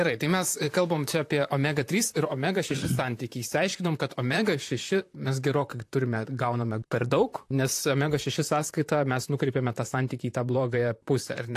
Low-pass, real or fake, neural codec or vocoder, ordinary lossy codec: 14.4 kHz; fake; vocoder, 44.1 kHz, 128 mel bands, Pupu-Vocoder; AAC, 64 kbps